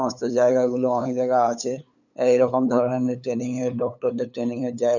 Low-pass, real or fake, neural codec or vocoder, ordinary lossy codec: 7.2 kHz; fake; codec, 16 kHz, 8 kbps, FunCodec, trained on LibriTTS, 25 frames a second; none